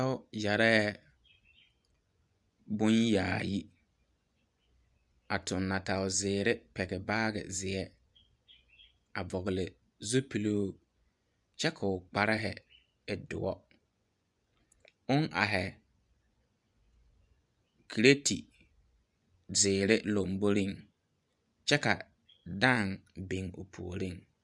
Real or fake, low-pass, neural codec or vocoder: fake; 10.8 kHz; vocoder, 24 kHz, 100 mel bands, Vocos